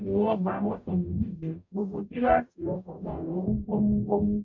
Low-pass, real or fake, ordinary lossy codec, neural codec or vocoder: 7.2 kHz; fake; MP3, 64 kbps; codec, 44.1 kHz, 0.9 kbps, DAC